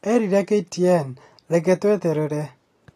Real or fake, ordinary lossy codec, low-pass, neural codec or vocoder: real; AAC, 48 kbps; 14.4 kHz; none